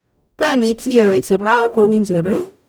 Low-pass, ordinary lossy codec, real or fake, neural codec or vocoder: none; none; fake; codec, 44.1 kHz, 0.9 kbps, DAC